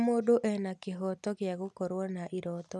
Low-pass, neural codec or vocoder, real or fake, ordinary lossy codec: none; none; real; none